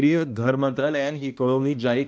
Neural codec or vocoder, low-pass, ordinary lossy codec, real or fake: codec, 16 kHz, 1 kbps, X-Codec, HuBERT features, trained on balanced general audio; none; none; fake